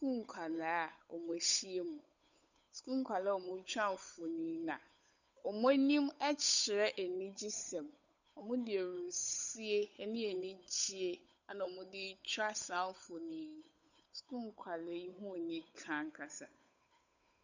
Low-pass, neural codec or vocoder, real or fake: 7.2 kHz; codec, 16 kHz, 8 kbps, FunCodec, trained on Chinese and English, 25 frames a second; fake